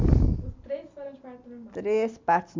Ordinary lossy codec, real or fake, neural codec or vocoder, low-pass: MP3, 64 kbps; real; none; 7.2 kHz